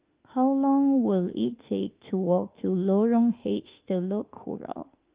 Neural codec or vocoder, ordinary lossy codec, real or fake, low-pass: autoencoder, 48 kHz, 32 numbers a frame, DAC-VAE, trained on Japanese speech; Opus, 32 kbps; fake; 3.6 kHz